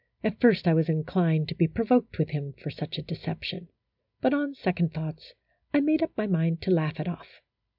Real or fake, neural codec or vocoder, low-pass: real; none; 5.4 kHz